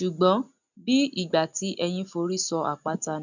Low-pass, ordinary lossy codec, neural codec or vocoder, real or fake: 7.2 kHz; none; none; real